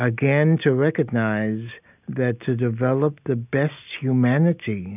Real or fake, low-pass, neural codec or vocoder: real; 3.6 kHz; none